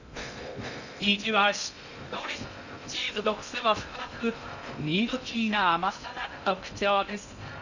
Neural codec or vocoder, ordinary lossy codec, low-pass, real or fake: codec, 16 kHz in and 24 kHz out, 0.6 kbps, FocalCodec, streaming, 2048 codes; none; 7.2 kHz; fake